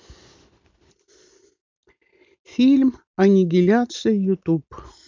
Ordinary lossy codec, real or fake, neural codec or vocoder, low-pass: MP3, 64 kbps; real; none; 7.2 kHz